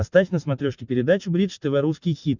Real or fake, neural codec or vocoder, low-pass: real; none; 7.2 kHz